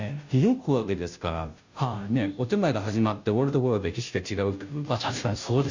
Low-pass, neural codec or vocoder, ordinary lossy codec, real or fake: 7.2 kHz; codec, 16 kHz, 0.5 kbps, FunCodec, trained on Chinese and English, 25 frames a second; Opus, 64 kbps; fake